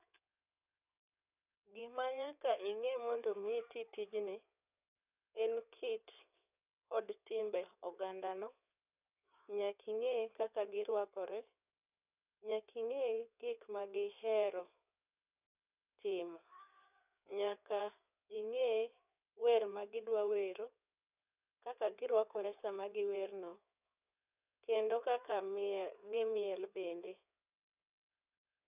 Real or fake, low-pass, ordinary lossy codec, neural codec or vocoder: fake; 3.6 kHz; none; codec, 16 kHz in and 24 kHz out, 2.2 kbps, FireRedTTS-2 codec